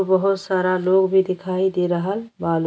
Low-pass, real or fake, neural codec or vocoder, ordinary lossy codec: none; real; none; none